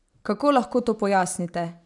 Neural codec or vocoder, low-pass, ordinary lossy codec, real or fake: none; 10.8 kHz; none; real